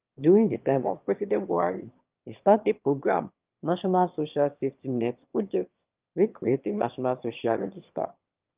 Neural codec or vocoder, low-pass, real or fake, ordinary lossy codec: autoencoder, 22.05 kHz, a latent of 192 numbers a frame, VITS, trained on one speaker; 3.6 kHz; fake; Opus, 24 kbps